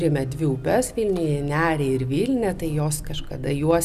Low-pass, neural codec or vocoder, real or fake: 14.4 kHz; none; real